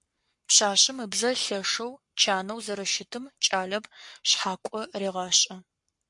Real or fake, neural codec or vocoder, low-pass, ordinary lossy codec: fake; codec, 44.1 kHz, 7.8 kbps, Pupu-Codec; 10.8 kHz; MP3, 64 kbps